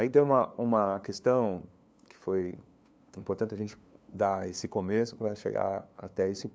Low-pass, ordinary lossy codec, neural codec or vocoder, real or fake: none; none; codec, 16 kHz, 2 kbps, FunCodec, trained on LibriTTS, 25 frames a second; fake